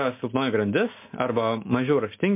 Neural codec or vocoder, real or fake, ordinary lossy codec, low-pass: none; real; MP3, 24 kbps; 3.6 kHz